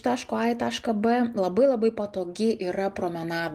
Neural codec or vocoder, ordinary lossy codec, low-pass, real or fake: none; Opus, 32 kbps; 14.4 kHz; real